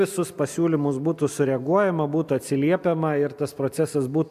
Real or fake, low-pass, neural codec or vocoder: real; 14.4 kHz; none